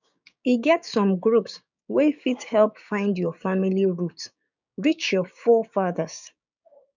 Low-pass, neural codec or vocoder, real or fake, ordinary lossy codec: 7.2 kHz; codec, 16 kHz, 6 kbps, DAC; fake; none